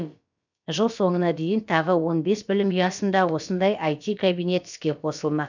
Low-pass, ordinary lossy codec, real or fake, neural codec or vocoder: 7.2 kHz; none; fake; codec, 16 kHz, about 1 kbps, DyCAST, with the encoder's durations